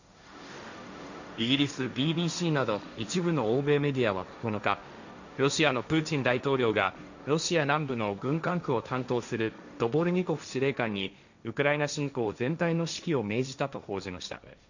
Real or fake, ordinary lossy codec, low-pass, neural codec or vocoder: fake; none; 7.2 kHz; codec, 16 kHz, 1.1 kbps, Voila-Tokenizer